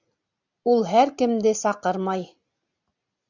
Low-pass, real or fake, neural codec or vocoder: 7.2 kHz; real; none